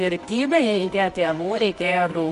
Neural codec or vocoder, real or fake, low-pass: codec, 24 kHz, 0.9 kbps, WavTokenizer, medium music audio release; fake; 10.8 kHz